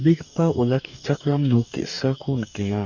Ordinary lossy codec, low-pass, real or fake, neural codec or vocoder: none; 7.2 kHz; fake; codec, 44.1 kHz, 2.6 kbps, DAC